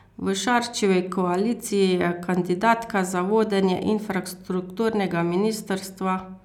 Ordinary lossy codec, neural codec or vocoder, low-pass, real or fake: none; none; 19.8 kHz; real